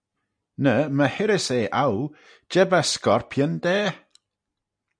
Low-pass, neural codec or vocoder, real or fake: 9.9 kHz; none; real